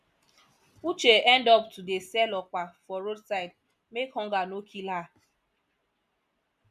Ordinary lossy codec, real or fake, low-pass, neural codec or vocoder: none; real; 14.4 kHz; none